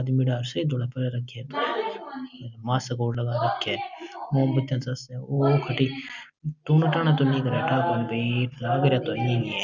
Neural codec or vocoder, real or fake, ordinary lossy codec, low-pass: none; real; none; 7.2 kHz